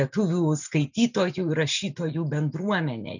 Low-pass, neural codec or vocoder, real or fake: 7.2 kHz; none; real